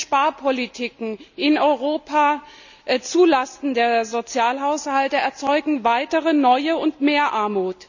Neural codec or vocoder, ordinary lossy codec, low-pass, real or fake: none; none; 7.2 kHz; real